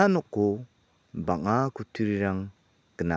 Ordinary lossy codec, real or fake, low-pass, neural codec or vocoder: none; real; none; none